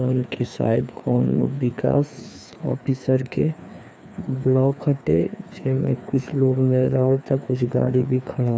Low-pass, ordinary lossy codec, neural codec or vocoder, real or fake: none; none; codec, 16 kHz, 2 kbps, FreqCodec, larger model; fake